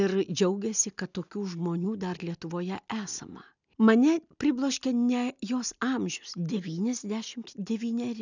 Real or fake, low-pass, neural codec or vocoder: real; 7.2 kHz; none